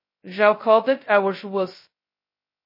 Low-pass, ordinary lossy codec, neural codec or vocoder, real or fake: 5.4 kHz; MP3, 24 kbps; codec, 16 kHz, 0.2 kbps, FocalCodec; fake